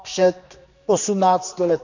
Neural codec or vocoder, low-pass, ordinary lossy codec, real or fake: codec, 16 kHz, 2 kbps, X-Codec, HuBERT features, trained on general audio; 7.2 kHz; none; fake